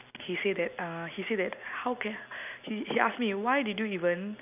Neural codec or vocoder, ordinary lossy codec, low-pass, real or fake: none; none; 3.6 kHz; real